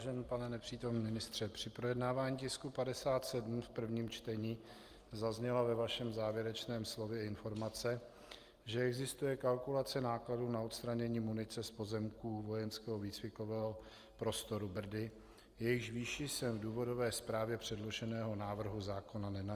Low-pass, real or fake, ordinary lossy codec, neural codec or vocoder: 14.4 kHz; real; Opus, 32 kbps; none